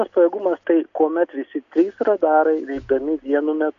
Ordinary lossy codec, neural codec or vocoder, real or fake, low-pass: AAC, 64 kbps; none; real; 7.2 kHz